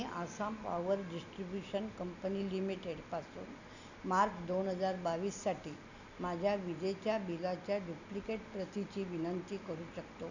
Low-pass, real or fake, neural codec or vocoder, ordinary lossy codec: 7.2 kHz; real; none; none